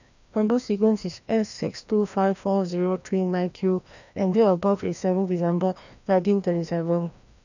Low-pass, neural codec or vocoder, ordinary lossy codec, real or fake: 7.2 kHz; codec, 16 kHz, 1 kbps, FreqCodec, larger model; none; fake